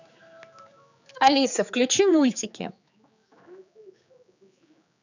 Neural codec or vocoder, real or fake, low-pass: codec, 16 kHz, 4 kbps, X-Codec, HuBERT features, trained on general audio; fake; 7.2 kHz